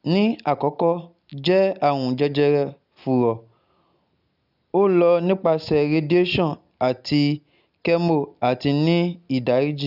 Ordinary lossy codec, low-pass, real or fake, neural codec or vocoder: none; 5.4 kHz; real; none